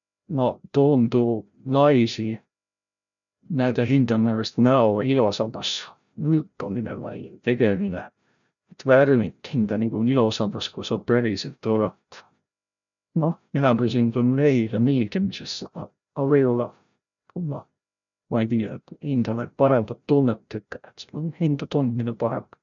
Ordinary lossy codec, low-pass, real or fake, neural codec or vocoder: none; 7.2 kHz; fake; codec, 16 kHz, 0.5 kbps, FreqCodec, larger model